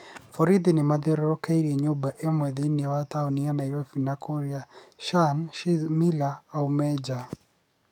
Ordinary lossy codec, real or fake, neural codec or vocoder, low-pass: none; fake; codec, 44.1 kHz, 7.8 kbps, DAC; 19.8 kHz